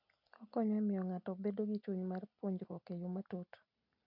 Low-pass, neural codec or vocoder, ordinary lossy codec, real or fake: 5.4 kHz; none; MP3, 48 kbps; real